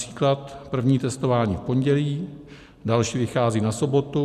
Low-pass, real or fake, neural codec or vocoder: 14.4 kHz; real; none